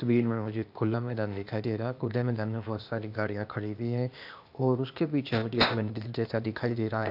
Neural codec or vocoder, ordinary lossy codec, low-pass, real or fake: codec, 16 kHz, 0.8 kbps, ZipCodec; AAC, 48 kbps; 5.4 kHz; fake